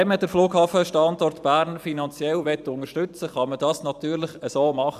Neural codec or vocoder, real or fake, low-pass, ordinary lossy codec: vocoder, 44.1 kHz, 128 mel bands every 512 samples, BigVGAN v2; fake; 14.4 kHz; none